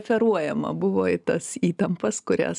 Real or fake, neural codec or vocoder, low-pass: real; none; 10.8 kHz